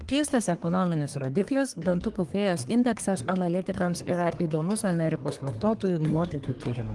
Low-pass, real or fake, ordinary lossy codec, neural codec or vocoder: 10.8 kHz; fake; Opus, 24 kbps; codec, 24 kHz, 1 kbps, SNAC